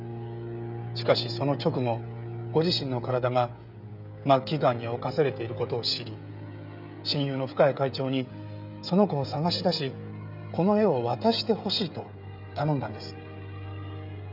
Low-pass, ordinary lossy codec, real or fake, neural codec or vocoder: 5.4 kHz; none; fake; codec, 16 kHz, 16 kbps, FreqCodec, smaller model